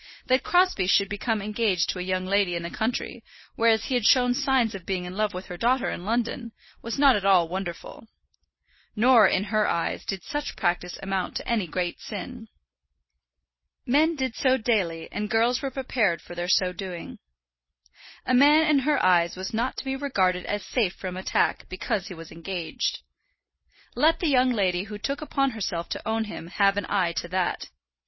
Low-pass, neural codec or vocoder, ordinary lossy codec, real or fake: 7.2 kHz; none; MP3, 24 kbps; real